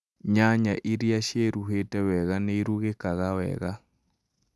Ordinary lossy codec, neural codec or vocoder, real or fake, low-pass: none; none; real; none